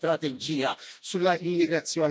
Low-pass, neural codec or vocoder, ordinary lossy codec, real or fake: none; codec, 16 kHz, 1 kbps, FreqCodec, smaller model; none; fake